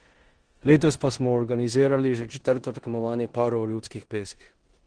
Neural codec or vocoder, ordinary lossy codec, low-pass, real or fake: codec, 16 kHz in and 24 kHz out, 0.9 kbps, LongCat-Audio-Codec, four codebook decoder; Opus, 16 kbps; 9.9 kHz; fake